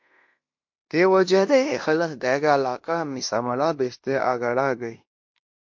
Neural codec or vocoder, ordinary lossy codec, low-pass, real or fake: codec, 16 kHz in and 24 kHz out, 0.9 kbps, LongCat-Audio-Codec, fine tuned four codebook decoder; MP3, 48 kbps; 7.2 kHz; fake